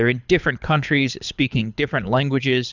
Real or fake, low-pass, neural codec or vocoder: fake; 7.2 kHz; codec, 24 kHz, 6 kbps, HILCodec